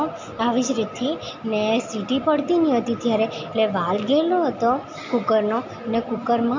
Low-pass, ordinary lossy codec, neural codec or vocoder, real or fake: 7.2 kHz; MP3, 48 kbps; none; real